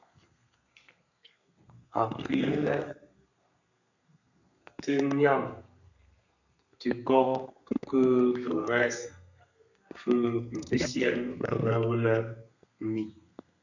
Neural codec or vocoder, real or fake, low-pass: codec, 32 kHz, 1.9 kbps, SNAC; fake; 7.2 kHz